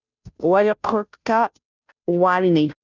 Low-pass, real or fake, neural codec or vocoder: 7.2 kHz; fake; codec, 16 kHz, 0.5 kbps, FunCodec, trained on Chinese and English, 25 frames a second